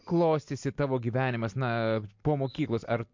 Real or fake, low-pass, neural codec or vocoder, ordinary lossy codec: real; 7.2 kHz; none; MP3, 48 kbps